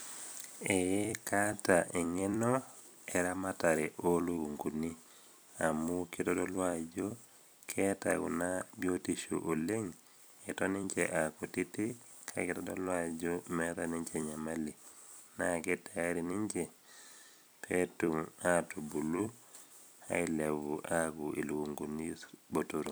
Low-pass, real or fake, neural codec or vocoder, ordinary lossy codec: none; fake; vocoder, 44.1 kHz, 128 mel bands every 256 samples, BigVGAN v2; none